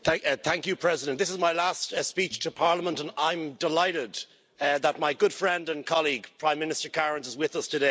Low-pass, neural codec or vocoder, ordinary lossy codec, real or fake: none; none; none; real